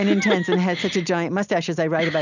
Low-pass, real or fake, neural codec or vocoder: 7.2 kHz; real; none